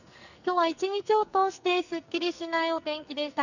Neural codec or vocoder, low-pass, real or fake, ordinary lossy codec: codec, 44.1 kHz, 2.6 kbps, SNAC; 7.2 kHz; fake; none